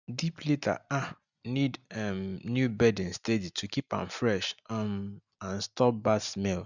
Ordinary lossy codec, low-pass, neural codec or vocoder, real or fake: none; 7.2 kHz; none; real